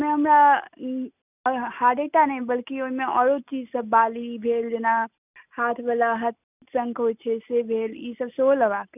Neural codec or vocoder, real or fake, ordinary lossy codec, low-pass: none; real; none; 3.6 kHz